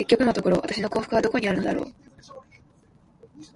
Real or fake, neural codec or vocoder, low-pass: fake; vocoder, 44.1 kHz, 128 mel bands every 512 samples, BigVGAN v2; 10.8 kHz